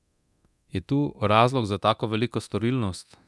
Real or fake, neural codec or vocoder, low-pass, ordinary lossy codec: fake; codec, 24 kHz, 0.9 kbps, DualCodec; none; none